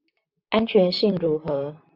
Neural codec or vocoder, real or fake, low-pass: vocoder, 44.1 kHz, 128 mel bands, Pupu-Vocoder; fake; 5.4 kHz